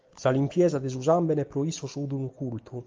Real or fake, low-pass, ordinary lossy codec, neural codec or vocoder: real; 7.2 kHz; Opus, 24 kbps; none